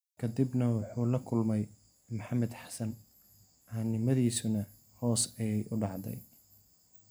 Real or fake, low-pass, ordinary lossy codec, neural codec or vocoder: real; none; none; none